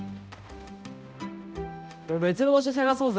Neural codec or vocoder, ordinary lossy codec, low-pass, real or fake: codec, 16 kHz, 0.5 kbps, X-Codec, HuBERT features, trained on balanced general audio; none; none; fake